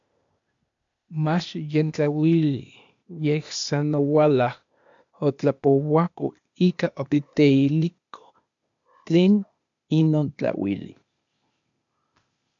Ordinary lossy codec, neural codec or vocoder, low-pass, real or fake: MP3, 64 kbps; codec, 16 kHz, 0.8 kbps, ZipCodec; 7.2 kHz; fake